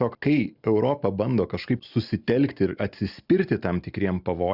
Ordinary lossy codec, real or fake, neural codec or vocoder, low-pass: Opus, 64 kbps; real; none; 5.4 kHz